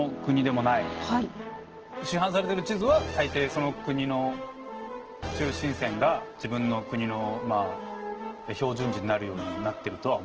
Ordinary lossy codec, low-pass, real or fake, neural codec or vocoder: Opus, 16 kbps; 7.2 kHz; real; none